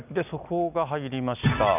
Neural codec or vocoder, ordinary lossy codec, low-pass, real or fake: none; none; 3.6 kHz; real